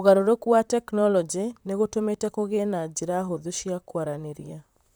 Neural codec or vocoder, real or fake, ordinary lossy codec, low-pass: vocoder, 44.1 kHz, 128 mel bands, Pupu-Vocoder; fake; none; none